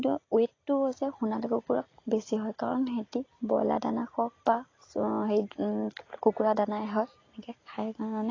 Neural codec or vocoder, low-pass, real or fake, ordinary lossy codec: none; 7.2 kHz; real; AAC, 32 kbps